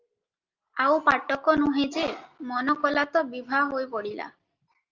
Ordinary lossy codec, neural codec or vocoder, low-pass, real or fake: Opus, 24 kbps; none; 7.2 kHz; real